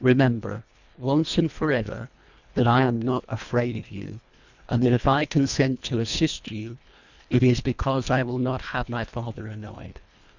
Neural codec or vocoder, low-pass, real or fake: codec, 24 kHz, 1.5 kbps, HILCodec; 7.2 kHz; fake